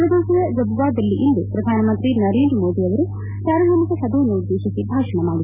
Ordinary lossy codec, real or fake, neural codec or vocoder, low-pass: none; real; none; 3.6 kHz